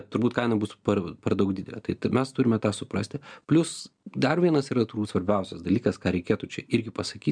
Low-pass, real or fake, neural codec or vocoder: 9.9 kHz; real; none